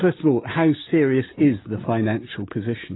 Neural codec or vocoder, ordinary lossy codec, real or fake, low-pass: none; AAC, 16 kbps; real; 7.2 kHz